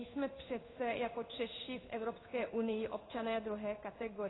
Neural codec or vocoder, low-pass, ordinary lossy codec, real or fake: codec, 16 kHz in and 24 kHz out, 1 kbps, XY-Tokenizer; 7.2 kHz; AAC, 16 kbps; fake